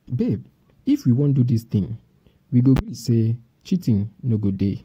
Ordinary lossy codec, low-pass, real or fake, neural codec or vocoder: AAC, 48 kbps; 19.8 kHz; real; none